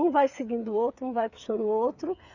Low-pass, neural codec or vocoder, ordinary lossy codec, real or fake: 7.2 kHz; codec, 16 kHz, 8 kbps, FreqCodec, smaller model; none; fake